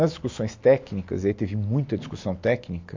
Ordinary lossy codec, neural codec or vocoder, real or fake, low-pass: MP3, 48 kbps; none; real; 7.2 kHz